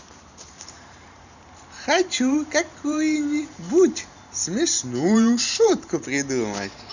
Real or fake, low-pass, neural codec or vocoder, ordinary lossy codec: fake; 7.2 kHz; vocoder, 44.1 kHz, 128 mel bands every 512 samples, BigVGAN v2; none